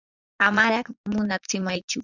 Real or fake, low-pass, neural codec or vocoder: fake; 7.2 kHz; vocoder, 44.1 kHz, 80 mel bands, Vocos